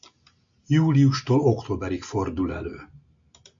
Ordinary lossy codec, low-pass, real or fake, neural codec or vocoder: AAC, 64 kbps; 7.2 kHz; real; none